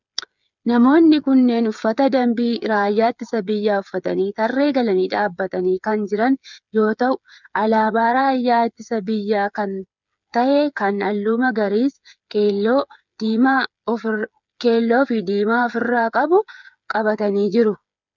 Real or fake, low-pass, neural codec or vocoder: fake; 7.2 kHz; codec, 16 kHz, 8 kbps, FreqCodec, smaller model